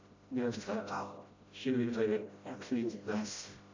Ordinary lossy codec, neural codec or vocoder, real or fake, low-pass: MP3, 48 kbps; codec, 16 kHz, 0.5 kbps, FreqCodec, smaller model; fake; 7.2 kHz